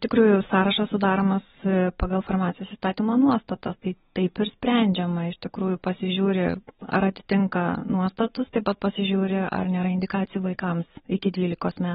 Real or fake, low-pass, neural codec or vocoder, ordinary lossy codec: real; 19.8 kHz; none; AAC, 16 kbps